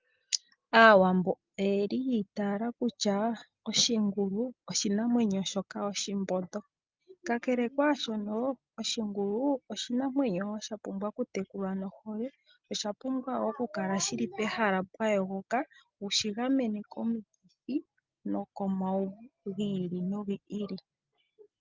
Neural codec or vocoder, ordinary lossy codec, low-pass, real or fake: none; Opus, 24 kbps; 7.2 kHz; real